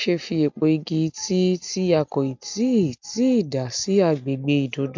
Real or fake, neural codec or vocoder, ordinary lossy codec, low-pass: real; none; MP3, 64 kbps; 7.2 kHz